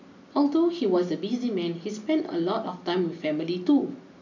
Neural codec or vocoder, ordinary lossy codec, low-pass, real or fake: none; none; 7.2 kHz; real